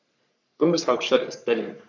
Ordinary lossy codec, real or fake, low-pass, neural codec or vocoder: none; fake; 7.2 kHz; codec, 44.1 kHz, 3.4 kbps, Pupu-Codec